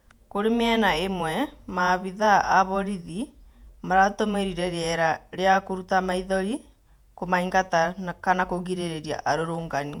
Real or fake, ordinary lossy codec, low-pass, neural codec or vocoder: fake; MP3, 96 kbps; 19.8 kHz; vocoder, 48 kHz, 128 mel bands, Vocos